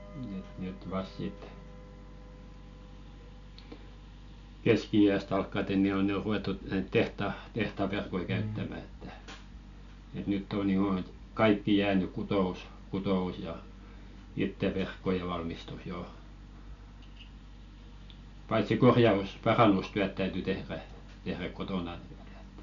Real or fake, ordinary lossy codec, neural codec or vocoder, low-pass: real; none; none; 7.2 kHz